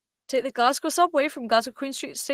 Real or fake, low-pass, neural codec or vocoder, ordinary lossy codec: real; 14.4 kHz; none; Opus, 16 kbps